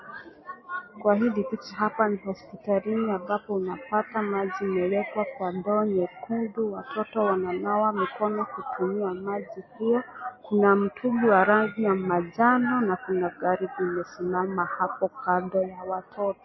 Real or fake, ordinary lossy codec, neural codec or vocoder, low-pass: real; MP3, 24 kbps; none; 7.2 kHz